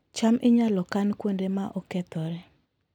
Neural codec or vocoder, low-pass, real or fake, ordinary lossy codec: none; 19.8 kHz; real; none